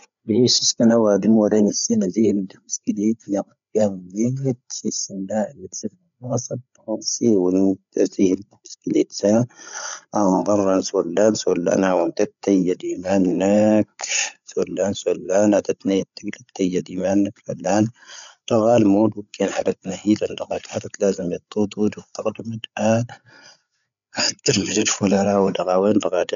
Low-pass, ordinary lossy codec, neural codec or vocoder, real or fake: 7.2 kHz; none; codec, 16 kHz, 8 kbps, FreqCodec, larger model; fake